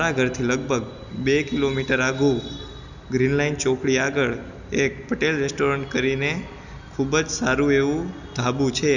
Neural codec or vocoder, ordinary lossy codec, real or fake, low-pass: none; none; real; 7.2 kHz